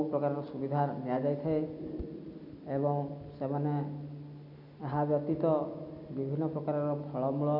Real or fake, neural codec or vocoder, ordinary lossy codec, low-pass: real; none; none; 5.4 kHz